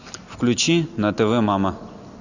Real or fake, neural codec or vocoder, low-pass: real; none; 7.2 kHz